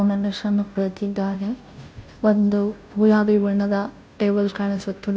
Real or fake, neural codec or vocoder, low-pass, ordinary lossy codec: fake; codec, 16 kHz, 0.5 kbps, FunCodec, trained on Chinese and English, 25 frames a second; none; none